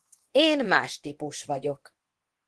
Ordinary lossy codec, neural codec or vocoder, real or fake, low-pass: Opus, 16 kbps; codec, 24 kHz, 0.9 kbps, DualCodec; fake; 10.8 kHz